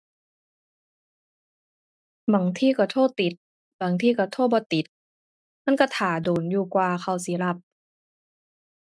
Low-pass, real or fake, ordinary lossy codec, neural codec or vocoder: none; real; none; none